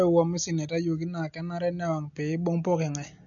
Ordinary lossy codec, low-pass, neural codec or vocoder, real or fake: none; 7.2 kHz; none; real